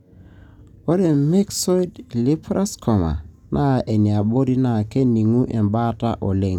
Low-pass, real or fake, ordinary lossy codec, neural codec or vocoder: 19.8 kHz; real; none; none